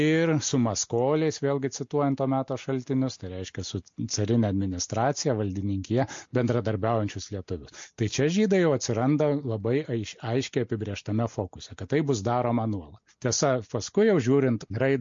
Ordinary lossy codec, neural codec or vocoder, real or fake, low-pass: MP3, 48 kbps; none; real; 7.2 kHz